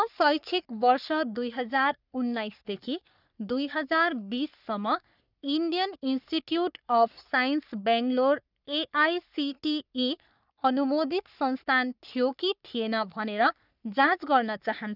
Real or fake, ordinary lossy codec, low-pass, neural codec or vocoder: fake; none; 5.4 kHz; codec, 44.1 kHz, 3.4 kbps, Pupu-Codec